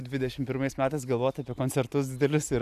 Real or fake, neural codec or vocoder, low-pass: real; none; 14.4 kHz